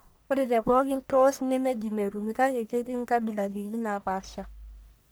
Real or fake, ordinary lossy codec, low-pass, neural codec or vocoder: fake; none; none; codec, 44.1 kHz, 1.7 kbps, Pupu-Codec